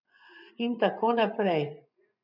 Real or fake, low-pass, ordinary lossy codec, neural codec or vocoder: fake; 5.4 kHz; none; vocoder, 24 kHz, 100 mel bands, Vocos